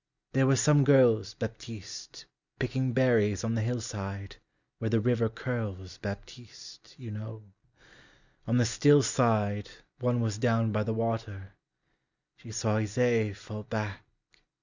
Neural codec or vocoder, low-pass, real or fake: none; 7.2 kHz; real